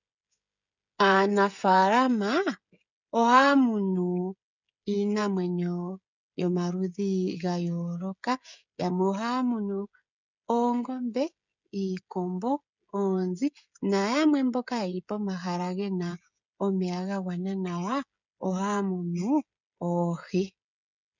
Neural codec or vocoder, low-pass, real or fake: codec, 16 kHz, 16 kbps, FreqCodec, smaller model; 7.2 kHz; fake